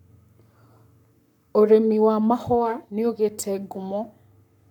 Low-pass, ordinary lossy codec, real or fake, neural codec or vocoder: 19.8 kHz; none; fake; codec, 44.1 kHz, 7.8 kbps, Pupu-Codec